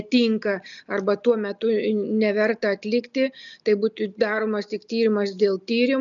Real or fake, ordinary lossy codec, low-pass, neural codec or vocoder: real; MP3, 96 kbps; 7.2 kHz; none